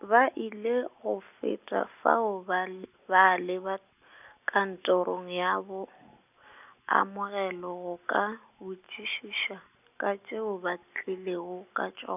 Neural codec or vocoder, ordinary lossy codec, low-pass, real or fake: none; none; 3.6 kHz; real